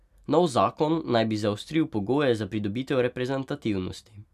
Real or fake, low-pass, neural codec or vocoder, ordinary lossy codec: real; 14.4 kHz; none; none